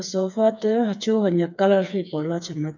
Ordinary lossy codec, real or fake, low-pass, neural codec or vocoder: none; fake; 7.2 kHz; codec, 16 kHz, 4 kbps, FreqCodec, smaller model